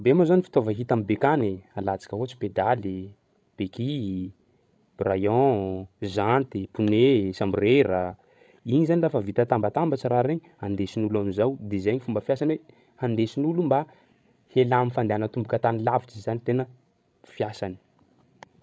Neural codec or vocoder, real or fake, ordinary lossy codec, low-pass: codec, 16 kHz, 16 kbps, FreqCodec, larger model; fake; none; none